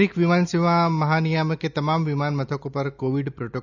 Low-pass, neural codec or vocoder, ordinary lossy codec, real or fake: 7.2 kHz; none; none; real